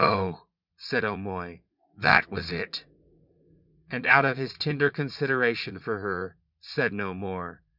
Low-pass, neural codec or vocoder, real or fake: 5.4 kHz; vocoder, 44.1 kHz, 80 mel bands, Vocos; fake